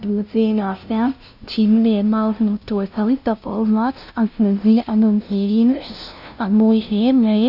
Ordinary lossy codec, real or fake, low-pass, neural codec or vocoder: none; fake; 5.4 kHz; codec, 16 kHz, 0.5 kbps, FunCodec, trained on LibriTTS, 25 frames a second